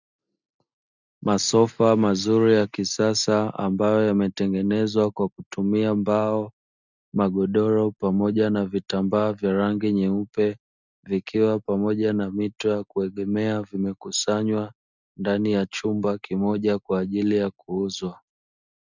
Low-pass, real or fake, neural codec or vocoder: 7.2 kHz; real; none